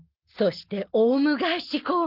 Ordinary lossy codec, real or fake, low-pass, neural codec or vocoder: Opus, 32 kbps; real; 5.4 kHz; none